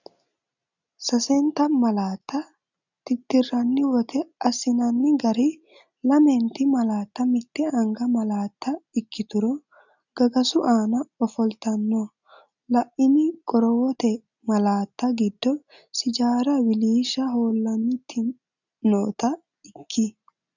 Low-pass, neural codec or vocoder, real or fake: 7.2 kHz; none; real